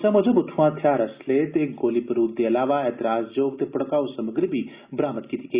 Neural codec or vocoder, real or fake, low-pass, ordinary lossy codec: none; real; 3.6 kHz; Opus, 64 kbps